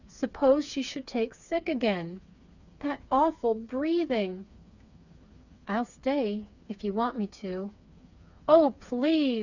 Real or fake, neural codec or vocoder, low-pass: fake; codec, 16 kHz, 4 kbps, FreqCodec, smaller model; 7.2 kHz